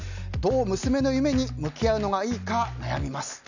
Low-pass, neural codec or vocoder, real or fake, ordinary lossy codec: 7.2 kHz; none; real; none